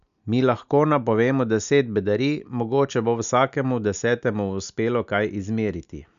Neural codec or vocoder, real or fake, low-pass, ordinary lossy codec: none; real; 7.2 kHz; none